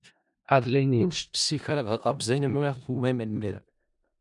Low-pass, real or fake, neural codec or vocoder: 10.8 kHz; fake; codec, 16 kHz in and 24 kHz out, 0.4 kbps, LongCat-Audio-Codec, four codebook decoder